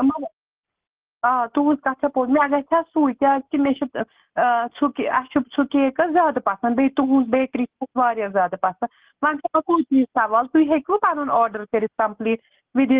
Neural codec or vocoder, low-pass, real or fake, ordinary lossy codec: none; 3.6 kHz; real; Opus, 16 kbps